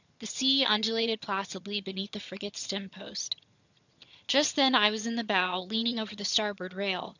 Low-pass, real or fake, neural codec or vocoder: 7.2 kHz; fake; vocoder, 22.05 kHz, 80 mel bands, HiFi-GAN